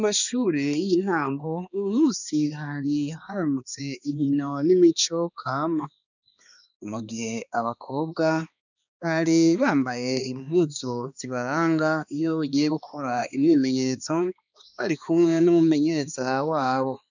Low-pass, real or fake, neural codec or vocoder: 7.2 kHz; fake; codec, 16 kHz, 2 kbps, X-Codec, HuBERT features, trained on balanced general audio